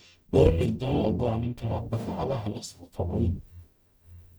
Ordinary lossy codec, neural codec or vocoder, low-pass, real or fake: none; codec, 44.1 kHz, 0.9 kbps, DAC; none; fake